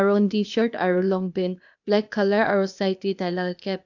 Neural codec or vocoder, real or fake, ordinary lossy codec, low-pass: codec, 16 kHz, 0.8 kbps, ZipCodec; fake; none; 7.2 kHz